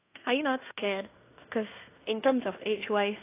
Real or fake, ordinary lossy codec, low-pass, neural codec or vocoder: fake; AAC, 24 kbps; 3.6 kHz; codec, 16 kHz in and 24 kHz out, 0.9 kbps, LongCat-Audio-Codec, fine tuned four codebook decoder